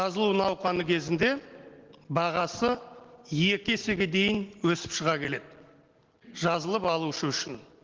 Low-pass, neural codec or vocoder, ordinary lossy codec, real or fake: 7.2 kHz; none; Opus, 16 kbps; real